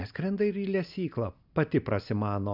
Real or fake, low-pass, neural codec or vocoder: real; 5.4 kHz; none